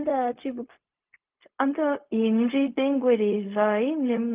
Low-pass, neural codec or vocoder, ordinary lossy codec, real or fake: 3.6 kHz; codec, 16 kHz, 0.4 kbps, LongCat-Audio-Codec; Opus, 24 kbps; fake